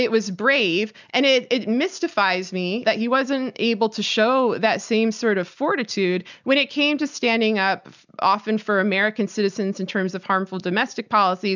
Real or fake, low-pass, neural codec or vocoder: real; 7.2 kHz; none